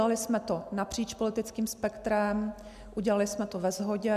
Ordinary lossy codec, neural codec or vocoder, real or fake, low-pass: AAC, 96 kbps; none; real; 14.4 kHz